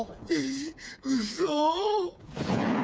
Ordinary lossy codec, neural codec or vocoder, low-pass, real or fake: none; codec, 16 kHz, 4 kbps, FreqCodec, smaller model; none; fake